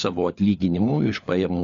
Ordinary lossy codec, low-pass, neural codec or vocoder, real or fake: Opus, 64 kbps; 7.2 kHz; codec, 16 kHz, 4 kbps, FunCodec, trained on LibriTTS, 50 frames a second; fake